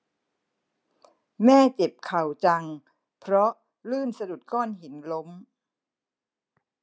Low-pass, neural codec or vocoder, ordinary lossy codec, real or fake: none; none; none; real